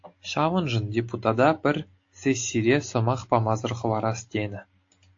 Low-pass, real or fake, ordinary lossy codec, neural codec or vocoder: 7.2 kHz; real; AAC, 48 kbps; none